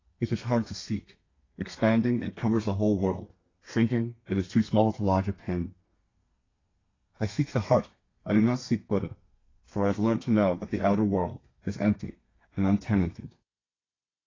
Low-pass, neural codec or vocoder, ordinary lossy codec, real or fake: 7.2 kHz; codec, 32 kHz, 1.9 kbps, SNAC; AAC, 32 kbps; fake